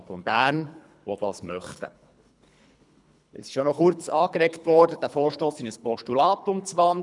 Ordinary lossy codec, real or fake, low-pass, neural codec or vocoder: none; fake; 10.8 kHz; codec, 24 kHz, 3 kbps, HILCodec